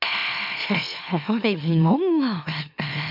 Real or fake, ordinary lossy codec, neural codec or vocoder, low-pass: fake; none; autoencoder, 44.1 kHz, a latent of 192 numbers a frame, MeloTTS; 5.4 kHz